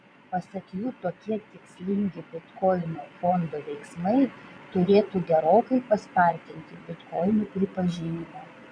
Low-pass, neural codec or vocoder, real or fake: 9.9 kHz; vocoder, 22.05 kHz, 80 mel bands, Vocos; fake